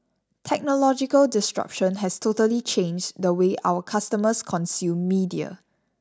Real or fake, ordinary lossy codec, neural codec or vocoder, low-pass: real; none; none; none